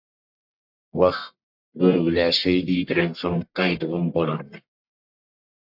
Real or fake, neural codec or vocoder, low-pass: fake; codec, 44.1 kHz, 1.7 kbps, Pupu-Codec; 5.4 kHz